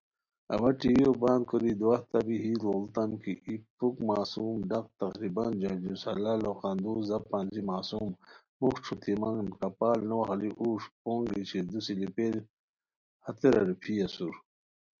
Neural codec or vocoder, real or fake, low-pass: none; real; 7.2 kHz